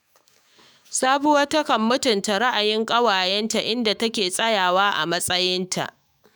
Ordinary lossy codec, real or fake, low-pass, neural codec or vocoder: none; fake; none; autoencoder, 48 kHz, 128 numbers a frame, DAC-VAE, trained on Japanese speech